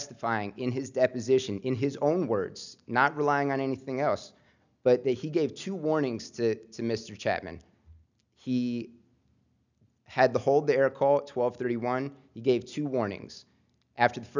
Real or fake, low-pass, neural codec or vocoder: real; 7.2 kHz; none